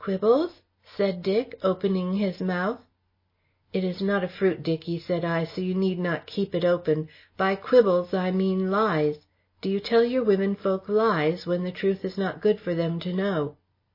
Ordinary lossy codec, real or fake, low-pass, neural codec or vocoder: MP3, 24 kbps; real; 5.4 kHz; none